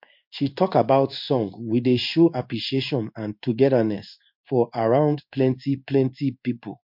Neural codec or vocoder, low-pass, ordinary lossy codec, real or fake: codec, 16 kHz in and 24 kHz out, 1 kbps, XY-Tokenizer; 5.4 kHz; MP3, 48 kbps; fake